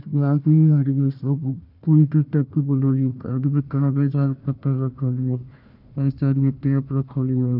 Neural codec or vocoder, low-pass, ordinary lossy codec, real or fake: codec, 16 kHz, 1 kbps, FunCodec, trained on Chinese and English, 50 frames a second; 5.4 kHz; none; fake